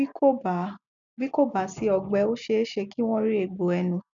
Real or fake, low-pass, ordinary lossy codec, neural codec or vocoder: real; 7.2 kHz; MP3, 64 kbps; none